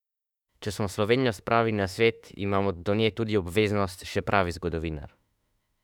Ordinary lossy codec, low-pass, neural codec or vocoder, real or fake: none; 19.8 kHz; autoencoder, 48 kHz, 32 numbers a frame, DAC-VAE, trained on Japanese speech; fake